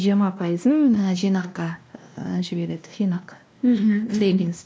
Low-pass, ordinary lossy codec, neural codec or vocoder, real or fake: none; none; codec, 16 kHz, 1 kbps, X-Codec, WavLM features, trained on Multilingual LibriSpeech; fake